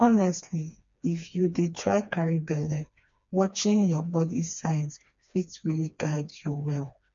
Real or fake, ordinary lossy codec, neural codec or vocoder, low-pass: fake; MP3, 48 kbps; codec, 16 kHz, 2 kbps, FreqCodec, smaller model; 7.2 kHz